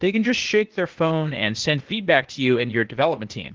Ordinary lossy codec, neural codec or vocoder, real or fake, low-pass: Opus, 16 kbps; codec, 16 kHz, 0.8 kbps, ZipCodec; fake; 7.2 kHz